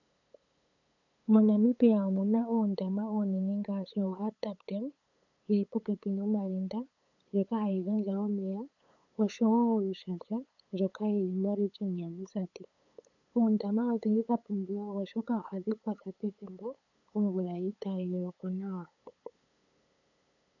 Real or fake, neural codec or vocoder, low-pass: fake; codec, 16 kHz, 8 kbps, FunCodec, trained on LibriTTS, 25 frames a second; 7.2 kHz